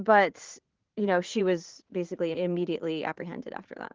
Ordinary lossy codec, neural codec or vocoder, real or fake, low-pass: Opus, 16 kbps; vocoder, 44.1 kHz, 80 mel bands, Vocos; fake; 7.2 kHz